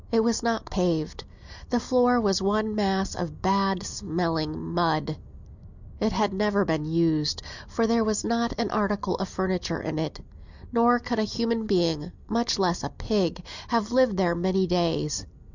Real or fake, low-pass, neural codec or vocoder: real; 7.2 kHz; none